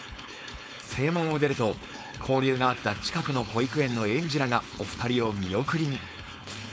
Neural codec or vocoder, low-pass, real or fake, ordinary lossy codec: codec, 16 kHz, 4.8 kbps, FACodec; none; fake; none